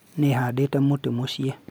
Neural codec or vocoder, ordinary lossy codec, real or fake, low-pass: none; none; real; none